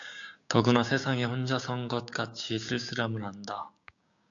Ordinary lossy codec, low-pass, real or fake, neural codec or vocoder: AAC, 64 kbps; 7.2 kHz; fake; codec, 16 kHz, 6 kbps, DAC